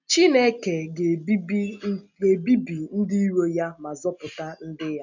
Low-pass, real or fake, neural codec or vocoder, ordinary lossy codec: 7.2 kHz; real; none; none